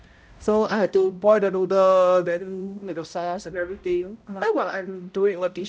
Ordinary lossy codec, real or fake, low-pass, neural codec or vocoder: none; fake; none; codec, 16 kHz, 0.5 kbps, X-Codec, HuBERT features, trained on balanced general audio